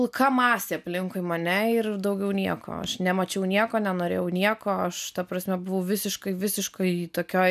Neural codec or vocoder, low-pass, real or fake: none; 14.4 kHz; real